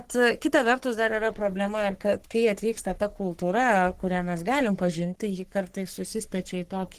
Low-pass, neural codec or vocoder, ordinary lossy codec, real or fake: 14.4 kHz; codec, 44.1 kHz, 3.4 kbps, Pupu-Codec; Opus, 16 kbps; fake